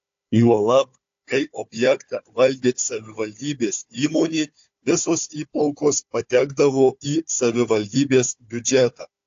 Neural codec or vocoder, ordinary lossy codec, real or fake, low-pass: codec, 16 kHz, 4 kbps, FunCodec, trained on Chinese and English, 50 frames a second; AAC, 48 kbps; fake; 7.2 kHz